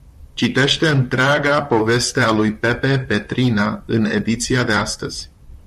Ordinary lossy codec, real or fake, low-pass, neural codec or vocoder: MP3, 64 kbps; fake; 14.4 kHz; vocoder, 44.1 kHz, 128 mel bands, Pupu-Vocoder